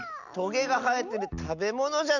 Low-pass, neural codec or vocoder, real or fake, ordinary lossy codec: 7.2 kHz; none; real; none